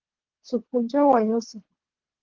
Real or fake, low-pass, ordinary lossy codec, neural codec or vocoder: fake; 7.2 kHz; Opus, 16 kbps; codec, 24 kHz, 3 kbps, HILCodec